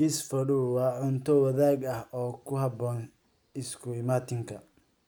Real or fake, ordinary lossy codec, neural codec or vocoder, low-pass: real; none; none; none